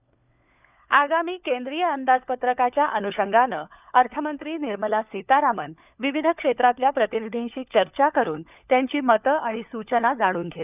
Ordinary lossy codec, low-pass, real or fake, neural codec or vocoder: none; 3.6 kHz; fake; codec, 16 kHz, 4 kbps, FunCodec, trained on LibriTTS, 50 frames a second